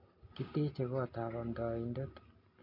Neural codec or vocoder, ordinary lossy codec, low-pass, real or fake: none; MP3, 32 kbps; 5.4 kHz; real